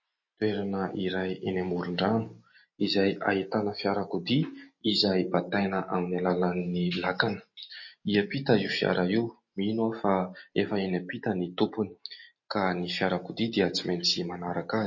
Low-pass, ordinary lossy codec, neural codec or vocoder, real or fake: 7.2 kHz; MP3, 32 kbps; none; real